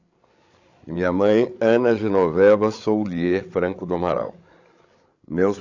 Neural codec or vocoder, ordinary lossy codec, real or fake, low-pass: codec, 16 kHz, 16 kbps, FreqCodec, larger model; AAC, 48 kbps; fake; 7.2 kHz